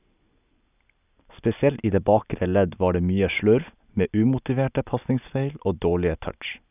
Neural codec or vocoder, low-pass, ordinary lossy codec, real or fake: none; 3.6 kHz; none; real